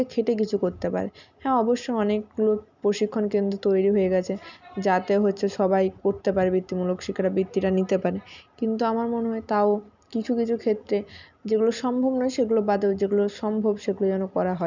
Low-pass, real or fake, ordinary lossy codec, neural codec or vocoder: 7.2 kHz; real; none; none